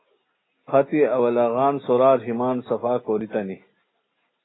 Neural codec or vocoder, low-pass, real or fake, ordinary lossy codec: none; 7.2 kHz; real; AAC, 16 kbps